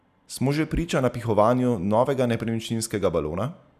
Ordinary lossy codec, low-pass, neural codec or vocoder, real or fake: none; 14.4 kHz; none; real